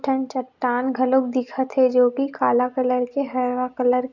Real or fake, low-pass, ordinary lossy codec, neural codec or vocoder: real; 7.2 kHz; none; none